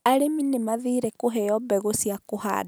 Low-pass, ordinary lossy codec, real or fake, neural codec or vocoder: none; none; real; none